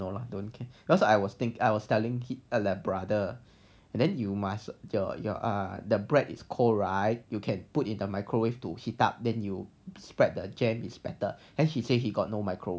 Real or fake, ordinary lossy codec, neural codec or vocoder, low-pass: real; none; none; none